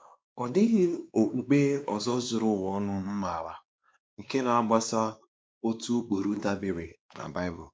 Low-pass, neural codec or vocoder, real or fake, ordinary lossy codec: none; codec, 16 kHz, 2 kbps, X-Codec, WavLM features, trained on Multilingual LibriSpeech; fake; none